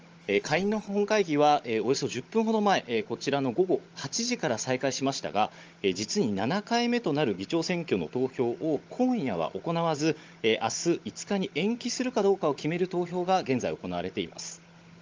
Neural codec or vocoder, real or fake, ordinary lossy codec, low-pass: codec, 16 kHz, 16 kbps, FunCodec, trained on Chinese and English, 50 frames a second; fake; Opus, 24 kbps; 7.2 kHz